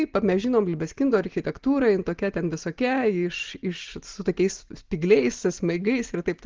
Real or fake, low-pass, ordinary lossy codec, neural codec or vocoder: real; 7.2 kHz; Opus, 16 kbps; none